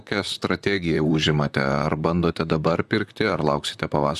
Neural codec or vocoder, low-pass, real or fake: vocoder, 44.1 kHz, 128 mel bands, Pupu-Vocoder; 14.4 kHz; fake